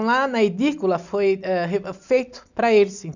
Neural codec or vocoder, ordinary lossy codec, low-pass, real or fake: none; none; 7.2 kHz; real